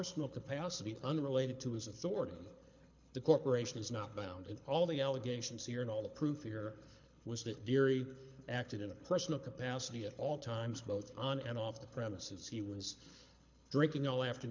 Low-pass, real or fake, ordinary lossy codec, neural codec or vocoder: 7.2 kHz; fake; MP3, 64 kbps; codec, 24 kHz, 6 kbps, HILCodec